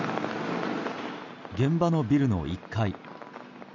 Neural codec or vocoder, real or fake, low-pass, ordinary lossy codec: none; real; 7.2 kHz; none